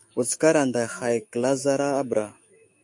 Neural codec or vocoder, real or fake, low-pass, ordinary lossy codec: autoencoder, 48 kHz, 128 numbers a frame, DAC-VAE, trained on Japanese speech; fake; 10.8 kHz; MP3, 48 kbps